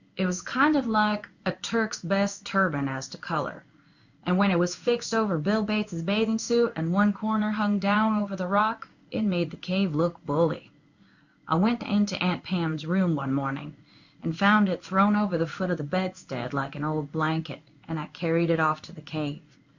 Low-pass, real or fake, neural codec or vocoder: 7.2 kHz; fake; codec, 16 kHz in and 24 kHz out, 1 kbps, XY-Tokenizer